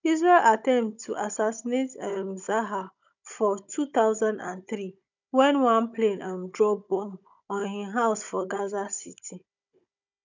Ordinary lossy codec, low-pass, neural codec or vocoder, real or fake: none; 7.2 kHz; codec, 16 kHz, 16 kbps, FunCodec, trained on Chinese and English, 50 frames a second; fake